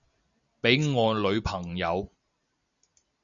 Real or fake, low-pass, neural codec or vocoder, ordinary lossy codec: real; 7.2 kHz; none; MP3, 96 kbps